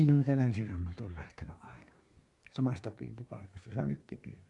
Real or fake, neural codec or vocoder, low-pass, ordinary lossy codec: fake; codec, 24 kHz, 1 kbps, SNAC; 10.8 kHz; AAC, 64 kbps